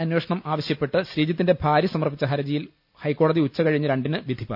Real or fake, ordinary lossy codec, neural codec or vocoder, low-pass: real; none; none; 5.4 kHz